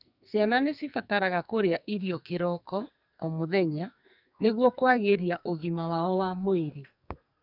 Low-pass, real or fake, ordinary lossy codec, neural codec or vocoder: 5.4 kHz; fake; none; codec, 44.1 kHz, 2.6 kbps, SNAC